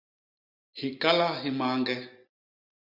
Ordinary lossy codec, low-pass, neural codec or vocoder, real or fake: Opus, 64 kbps; 5.4 kHz; none; real